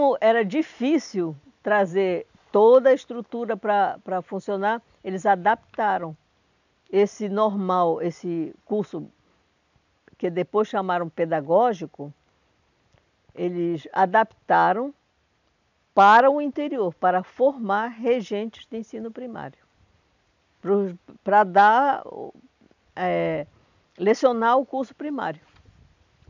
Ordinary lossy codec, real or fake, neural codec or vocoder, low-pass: none; real; none; 7.2 kHz